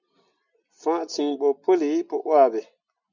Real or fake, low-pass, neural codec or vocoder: real; 7.2 kHz; none